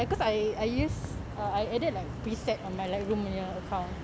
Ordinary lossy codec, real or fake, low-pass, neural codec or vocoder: none; real; none; none